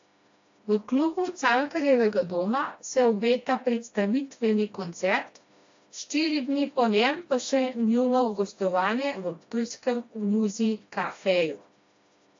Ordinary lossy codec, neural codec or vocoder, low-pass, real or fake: AAC, 48 kbps; codec, 16 kHz, 1 kbps, FreqCodec, smaller model; 7.2 kHz; fake